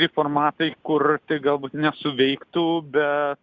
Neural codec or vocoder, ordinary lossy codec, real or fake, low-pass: none; Opus, 64 kbps; real; 7.2 kHz